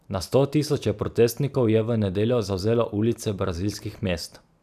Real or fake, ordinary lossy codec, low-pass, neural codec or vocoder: real; none; 14.4 kHz; none